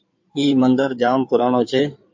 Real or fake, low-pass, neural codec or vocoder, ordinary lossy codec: fake; 7.2 kHz; codec, 16 kHz in and 24 kHz out, 2.2 kbps, FireRedTTS-2 codec; MP3, 48 kbps